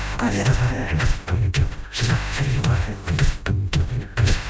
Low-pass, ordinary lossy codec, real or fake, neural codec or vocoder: none; none; fake; codec, 16 kHz, 0.5 kbps, FreqCodec, smaller model